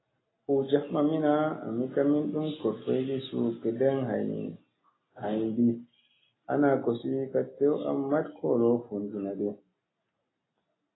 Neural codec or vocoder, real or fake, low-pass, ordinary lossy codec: none; real; 7.2 kHz; AAC, 16 kbps